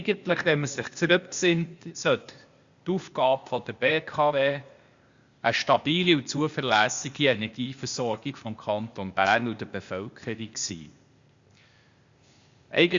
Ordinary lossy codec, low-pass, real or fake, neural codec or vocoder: Opus, 64 kbps; 7.2 kHz; fake; codec, 16 kHz, 0.8 kbps, ZipCodec